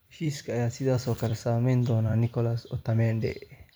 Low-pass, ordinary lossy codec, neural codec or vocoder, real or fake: none; none; vocoder, 44.1 kHz, 128 mel bands every 256 samples, BigVGAN v2; fake